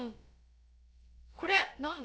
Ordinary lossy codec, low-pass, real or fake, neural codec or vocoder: none; none; fake; codec, 16 kHz, about 1 kbps, DyCAST, with the encoder's durations